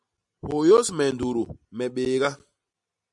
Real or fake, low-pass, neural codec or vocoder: real; 10.8 kHz; none